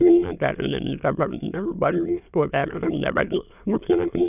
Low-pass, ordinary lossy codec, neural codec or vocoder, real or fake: 3.6 kHz; none; autoencoder, 22.05 kHz, a latent of 192 numbers a frame, VITS, trained on many speakers; fake